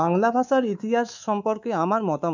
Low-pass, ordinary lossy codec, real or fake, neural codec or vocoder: 7.2 kHz; none; fake; codec, 24 kHz, 3.1 kbps, DualCodec